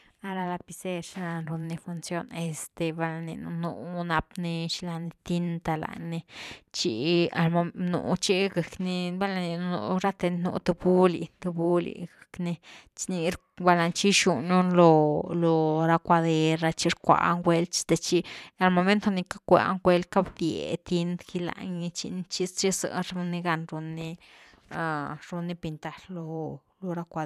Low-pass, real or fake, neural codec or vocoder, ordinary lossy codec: 14.4 kHz; fake; vocoder, 44.1 kHz, 128 mel bands every 512 samples, BigVGAN v2; none